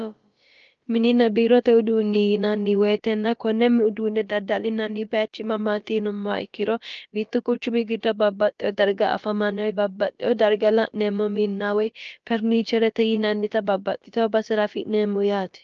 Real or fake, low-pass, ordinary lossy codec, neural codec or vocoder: fake; 7.2 kHz; Opus, 24 kbps; codec, 16 kHz, about 1 kbps, DyCAST, with the encoder's durations